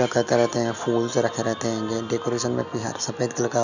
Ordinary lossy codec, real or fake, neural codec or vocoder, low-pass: none; real; none; 7.2 kHz